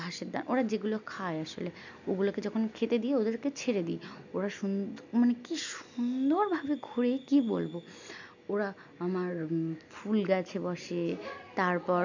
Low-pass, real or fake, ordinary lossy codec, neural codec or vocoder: 7.2 kHz; real; none; none